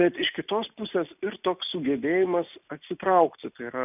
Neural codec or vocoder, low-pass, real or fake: none; 3.6 kHz; real